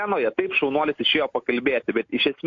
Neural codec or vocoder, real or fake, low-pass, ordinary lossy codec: none; real; 7.2 kHz; AAC, 48 kbps